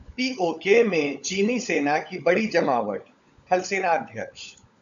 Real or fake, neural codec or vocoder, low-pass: fake; codec, 16 kHz, 16 kbps, FunCodec, trained on LibriTTS, 50 frames a second; 7.2 kHz